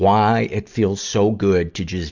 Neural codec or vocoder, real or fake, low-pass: none; real; 7.2 kHz